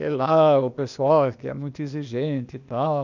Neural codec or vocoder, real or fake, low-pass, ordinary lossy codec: codec, 16 kHz, 0.8 kbps, ZipCodec; fake; 7.2 kHz; none